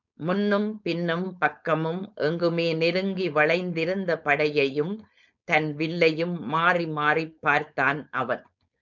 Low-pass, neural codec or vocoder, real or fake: 7.2 kHz; codec, 16 kHz, 4.8 kbps, FACodec; fake